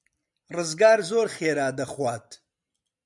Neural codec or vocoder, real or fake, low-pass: none; real; 10.8 kHz